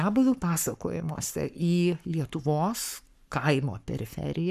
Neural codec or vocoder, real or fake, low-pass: codec, 44.1 kHz, 7.8 kbps, Pupu-Codec; fake; 14.4 kHz